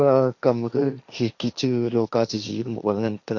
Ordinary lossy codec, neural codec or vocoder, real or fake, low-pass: none; codec, 16 kHz, 1.1 kbps, Voila-Tokenizer; fake; 7.2 kHz